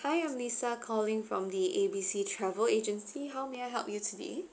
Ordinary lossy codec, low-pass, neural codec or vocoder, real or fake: none; none; none; real